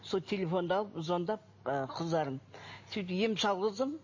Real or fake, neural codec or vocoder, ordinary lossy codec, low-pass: real; none; MP3, 32 kbps; 7.2 kHz